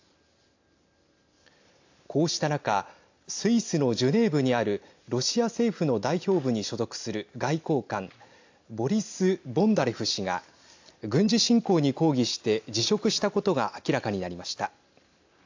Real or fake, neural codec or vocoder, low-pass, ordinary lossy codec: real; none; 7.2 kHz; AAC, 48 kbps